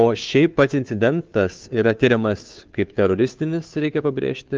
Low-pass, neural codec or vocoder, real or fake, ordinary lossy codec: 7.2 kHz; codec, 16 kHz, 2 kbps, FunCodec, trained on Chinese and English, 25 frames a second; fake; Opus, 24 kbps